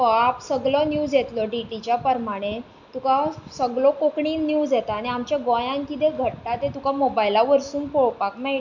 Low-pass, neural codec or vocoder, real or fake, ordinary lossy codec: 7.2 kHz; none; real; none